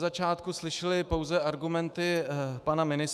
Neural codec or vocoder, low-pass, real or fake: autoencoder, 48 kHz, 128 numbers a frame, DAC-VAE, trained on Japanese speech; 14.4 kHz; fake